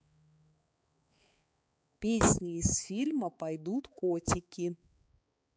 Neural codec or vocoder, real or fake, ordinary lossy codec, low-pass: codec, 16 kHz, 4 kbps, X-Codec, HuBERT features, trained on balanced general audio; fake; none; none